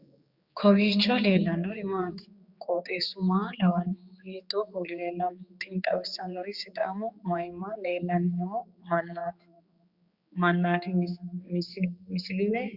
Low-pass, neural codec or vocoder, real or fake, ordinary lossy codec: 5.4 kHz; codec, 16 kHz, 4 kbps, X-Codec, HuBERT features, trained on general audio; fake; Opus, 64 kbps